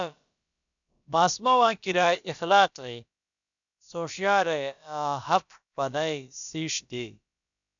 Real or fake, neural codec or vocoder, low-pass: fake; codec, 16 kHz, about 1 kbps, DyCAST, with the encoder's durations; 7.2 kHz